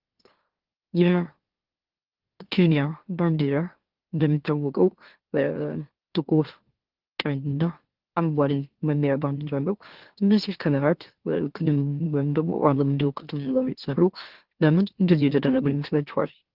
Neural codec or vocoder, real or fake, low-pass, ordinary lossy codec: autoencoder, 44.1 kHz, a latent of 192 numbers a frame, MeloTTS; fake; 5.4 kHz; Opus, 16 kbps